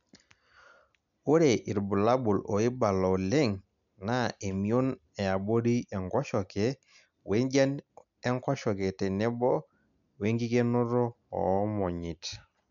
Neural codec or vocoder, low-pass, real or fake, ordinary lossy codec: none; 7.2 kHz; real; none